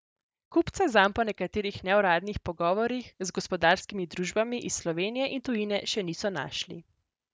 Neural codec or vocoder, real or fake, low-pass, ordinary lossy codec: none; real; none; none